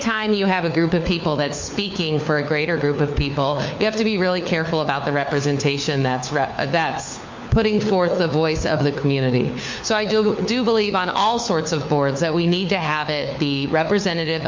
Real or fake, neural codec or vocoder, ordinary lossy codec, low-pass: fake; codec, 16 kHz, 4 kbps, FunCodec, trained on LibriTTS, 50 frames a second; MP3, 48 kbps; 7.2 kHz